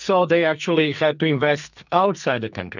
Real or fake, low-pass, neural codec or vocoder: fake; 7.2 kHz; codec, 44.1 kHz, 2.6 kbps, SNAC